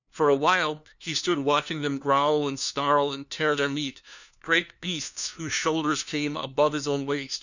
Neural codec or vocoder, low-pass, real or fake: codec, 16 kHz, 1 kbps, FunCodec, trained on LibriTTS, 50 frames a second; 7.2 kHz; fake